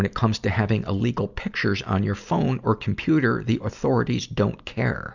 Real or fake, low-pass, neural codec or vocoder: real; 7.2 kHz; none